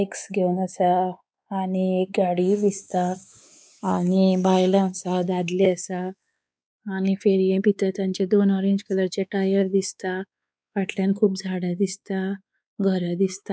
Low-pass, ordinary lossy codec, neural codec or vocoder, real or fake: none; none; codec, 16 kHz, 4 kbps, X-Codec, WavLM features, trained on Multilingual LibriSpeech; fake